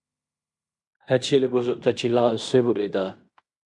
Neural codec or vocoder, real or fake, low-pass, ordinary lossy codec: codec, 16 kHz in and 24 kHz out, 0.9 kbps, LongCat-Audio-Codec, fine tuned four codebook decoder; fake; 10.8 kHz; MP3, 96 kbps